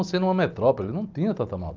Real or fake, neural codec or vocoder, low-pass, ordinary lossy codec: real; none; 7.2 kHz; Opus, 32 kbps